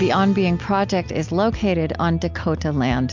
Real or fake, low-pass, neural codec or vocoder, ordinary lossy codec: real; 7.2 kHz; none; MP3, 64 kbps